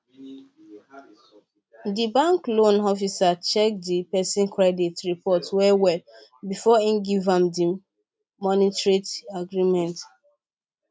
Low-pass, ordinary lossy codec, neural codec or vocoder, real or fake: none; none; none; real